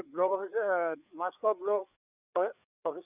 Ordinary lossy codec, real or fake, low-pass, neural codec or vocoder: none; fake; 3.6 kHz; codec, 16 kHz, 4 kbps, FreqCodec, larger model